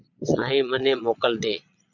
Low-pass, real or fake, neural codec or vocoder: 7.2 kHz; fake; vocoder, 22.05 kHz, 80 mel bands, Vocos